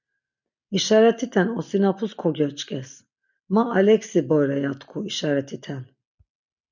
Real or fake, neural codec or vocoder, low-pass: real; none; 7.2 kHz